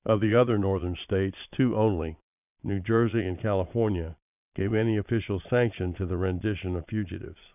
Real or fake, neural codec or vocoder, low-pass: fake; vocoder, 22.05 kHz, 80 mel bands, Vocos; 3.6 kHz